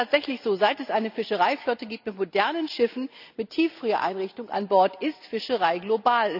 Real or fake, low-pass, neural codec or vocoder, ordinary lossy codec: real; 5.4 kHz; none; none